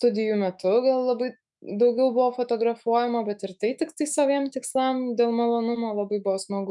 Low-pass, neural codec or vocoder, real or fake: 10.8 kHz; autoencoder, 48 kHz, 128 numbers a frame, DAC-VAE, trained on Japanese speech; fake